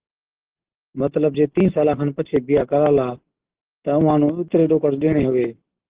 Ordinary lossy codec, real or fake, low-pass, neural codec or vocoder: Opus, 16 kbps; real; 3.6 kHz; none